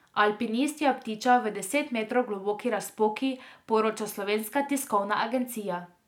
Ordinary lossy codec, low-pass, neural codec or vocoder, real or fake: none; 19.8 kHz; none; real